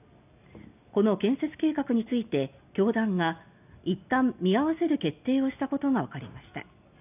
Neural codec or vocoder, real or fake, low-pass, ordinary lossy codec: none; real; 3.6 kHz; none